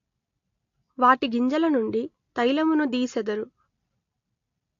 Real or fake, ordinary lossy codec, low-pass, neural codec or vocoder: real; AAC, 48 kbps; 7.2 kHz; none